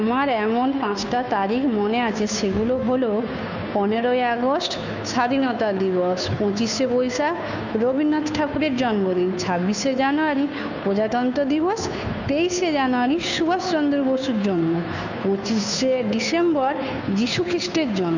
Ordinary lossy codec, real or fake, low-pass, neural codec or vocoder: none; fake; 7.2 kHz; codec, 16 kHz, 2 kbps, FunCodec, trained on Chinese and English, 25 frames a second